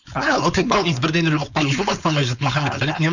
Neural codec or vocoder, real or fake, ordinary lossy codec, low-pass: codec, 16 kHz, 4.8 kbps, FACodec; fake; none; 7.2 kHz